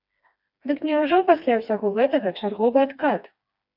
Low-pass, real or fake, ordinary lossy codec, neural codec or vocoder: 5.4 kHz; fake; MP3, 48 kbps; codec, 16 kHz, 2 kbps, FreqCodec, smaller model